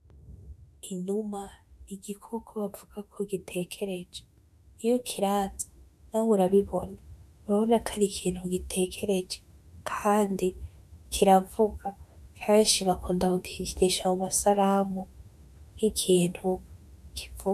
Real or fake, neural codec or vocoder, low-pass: fake; autoencoder, 48 kHz, 32 numbers a frame, DAC-VAE, trained on Japanese speech; 14.4 kHz